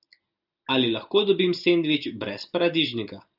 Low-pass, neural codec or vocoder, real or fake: 5.4 kHz; none; real